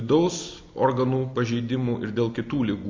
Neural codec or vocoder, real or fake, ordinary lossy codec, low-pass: none; real; MP3, 48 kbps; 7.2 kHz